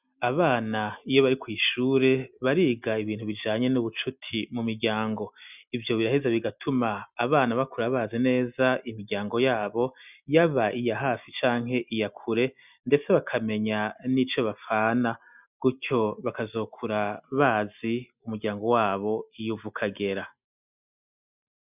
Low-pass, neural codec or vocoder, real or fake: 3.6 kHz; none; real